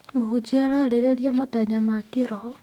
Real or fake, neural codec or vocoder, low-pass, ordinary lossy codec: fake; codec, 44.1 kHz, 2.6 kbps, DAC; 19.8 kHz; none